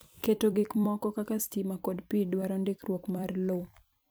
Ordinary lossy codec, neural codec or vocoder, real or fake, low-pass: none; vocoder, 44.1 kHz, 128 mel bands every 256 samples, BigVGAN v2; fake; none